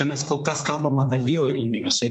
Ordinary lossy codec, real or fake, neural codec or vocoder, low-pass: MP3, 64 kbps; fake; codec, 24 kHz, 1 kbps, SNAC; 10.8 kHz